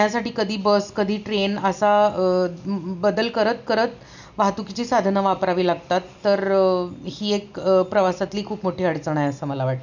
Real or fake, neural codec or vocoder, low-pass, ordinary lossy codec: real; none; 7.2 kHz; none